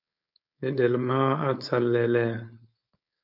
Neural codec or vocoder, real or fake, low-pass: codec, 16 kHz, 4.8 kbps, FACodec; fake; 5.4 kHz